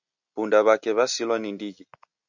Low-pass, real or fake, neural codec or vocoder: 7.2 kHz; real; none